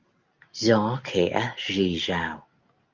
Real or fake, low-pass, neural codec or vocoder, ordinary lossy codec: real; 7.2 kHz; none; Opus, 24 kbps